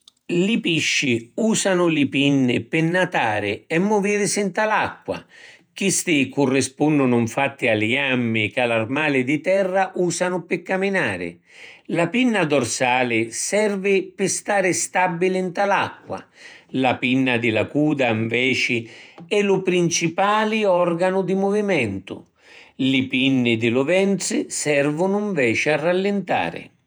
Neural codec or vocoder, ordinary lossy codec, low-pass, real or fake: vocoder, 48 kHz, 128 mel bands, Vocos; none; none; fake